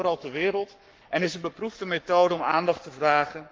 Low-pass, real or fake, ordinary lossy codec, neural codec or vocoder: 7.2 kHz; fake; Opus, 32 kbps; codec, 44.1 kHz, 7.8 kbps, Pupu-Codec